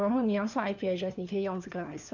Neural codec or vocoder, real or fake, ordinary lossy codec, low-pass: codec, 16 kHz, 4 kbps, FunCodec, trained on LibriTTS, 50 frames a second; fake; none; 7.2 kHz